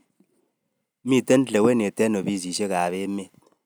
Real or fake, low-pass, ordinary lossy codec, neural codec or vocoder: real; none; none; none